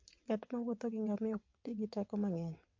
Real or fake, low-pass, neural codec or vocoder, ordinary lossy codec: fake; 7.2 kHz; vocoder, 22.05 kHz, 80 mel bands, WaveNeXt; MP3, 48 kbps